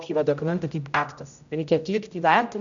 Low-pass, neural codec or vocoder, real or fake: 7.2 kHz; codec, 16 kHz, 0.5 kbps, X-Codec, HuBERT features, trained on general audio; fake